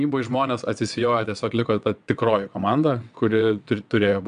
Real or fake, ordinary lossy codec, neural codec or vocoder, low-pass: fake; MP3, 96 kbps; vocoder, 22.05 kHz, 80 mel bands, WaveNeXt; 9.9 kHz